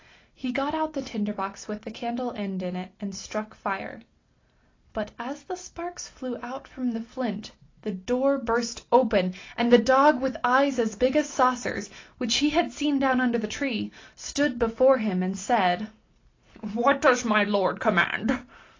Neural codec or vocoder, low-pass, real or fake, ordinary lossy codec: none; 7.2 kHz; real; AAC, 32 kbps